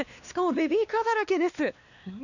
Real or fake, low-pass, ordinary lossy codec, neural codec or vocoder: fake; 7.2 kHz; none; codec, 16 kHz, 2 kbps, X-Codec, WavLM features, trained on Multilingual LibriSpeech